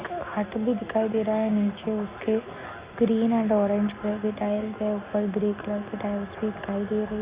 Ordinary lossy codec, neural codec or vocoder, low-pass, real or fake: Opus, 64 kbps; none; 3.6 kHz; real